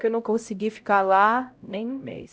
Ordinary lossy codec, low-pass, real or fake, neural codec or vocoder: none; none; fake; codec, 16 kHz, 0.5 kbps, X-Codec, HuBERT features, trained on LibriSpeech